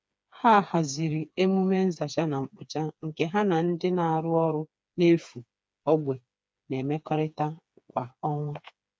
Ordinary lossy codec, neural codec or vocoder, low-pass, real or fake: none; codec, 16 kHz, 4 kbps, FreqCodec, smaller model; none; fake